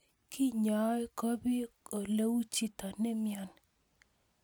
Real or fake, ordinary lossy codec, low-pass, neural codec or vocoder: real; none; none; none